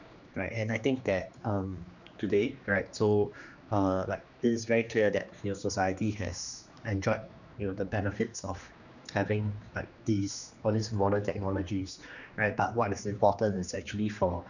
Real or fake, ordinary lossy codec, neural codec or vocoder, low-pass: fake; none; codec, 16 kHz, 2 kbps, X-Codec, HuBERT features, trained on general audio; 7.2 kHz